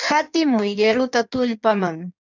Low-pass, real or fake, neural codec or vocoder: 7.2 kHz; fake; codec, 16 kHz in and 24 kHz out, 1.1 kbps, FireRedTTS-2 codec